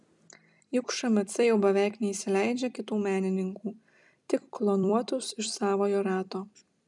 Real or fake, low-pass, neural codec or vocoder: fake; 10.8 kHz; vocoder, 44.1 kHz, 128 mel bands every 256 samples, BigVGAN v2